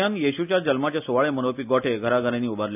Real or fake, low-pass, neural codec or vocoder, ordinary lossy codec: real; 3.6 kHz; none; none